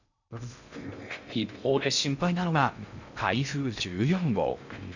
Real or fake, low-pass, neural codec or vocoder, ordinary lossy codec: fake; 7.2 kHz; codec, 16 kHz in and 24 kHz out, 0.6 kbps, FocalCodec, streaming, 2048 codes; none